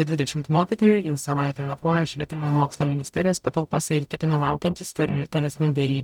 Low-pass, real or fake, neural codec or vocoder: 19.8 kHz; fake; codec, 44.1 kHz, 0.9 kbps, DAC